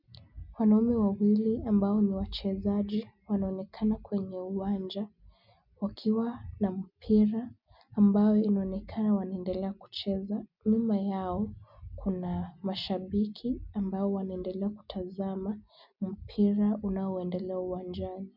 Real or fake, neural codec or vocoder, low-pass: real; none; 5.4 kHz